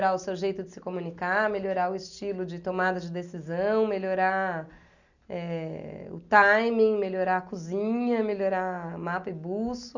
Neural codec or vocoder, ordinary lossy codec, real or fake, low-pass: none; none; real; 7.2 kHz